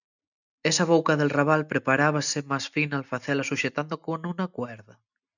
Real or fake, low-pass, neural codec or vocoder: real; 7.2 kHz; none